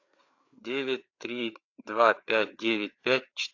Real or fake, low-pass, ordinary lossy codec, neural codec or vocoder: fake; 7.2 kHz; AAC, 48 kbps; codec, 16 kHz, 4 kbps, FreqCodec, larger model